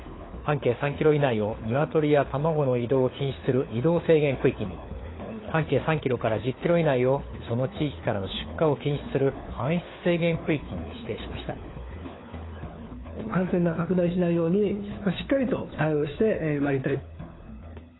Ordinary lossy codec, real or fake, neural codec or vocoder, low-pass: AAC, 16 kbps; fake; codec, 16 kHz, 4 kbps, FunCodec, trained on LibriTTS, 50 frames a second; 7.2 kHz